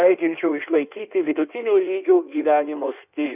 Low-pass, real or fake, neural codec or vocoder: 3.6 kHz; fake; codec, 16 kHz in and 24 kHz out, 1.1 kbps, FireRedTTS-2 codec